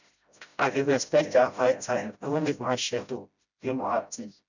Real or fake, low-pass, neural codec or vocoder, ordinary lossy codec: fake; 7.2 kHz; codec, 16 kHz, 0.5 kbps, FreqCodec, smaller model; none